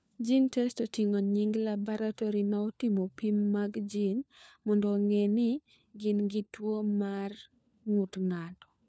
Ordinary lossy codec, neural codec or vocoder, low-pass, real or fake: none; codec, 16 kHz, 4 kbps, FunCodec, trained on LibriTTS, 50 frames a second; none; fake